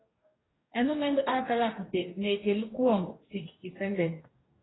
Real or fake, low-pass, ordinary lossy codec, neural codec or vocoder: fake; 7.2 kHz; AAC, 16 kbps; codec, 44.1 kHz, 2.6 kbps, DAC